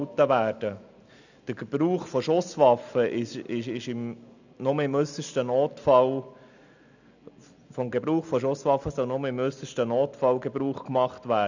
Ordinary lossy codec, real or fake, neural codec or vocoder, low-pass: none; real; none; 7.2 kHz